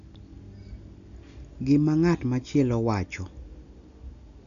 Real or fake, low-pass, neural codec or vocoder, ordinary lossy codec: real; 7.2 kHz; none; none